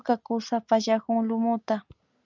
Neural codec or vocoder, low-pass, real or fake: none; 7.2 kHz; real